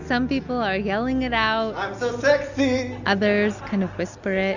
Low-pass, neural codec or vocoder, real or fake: 7.2 kHz; none; real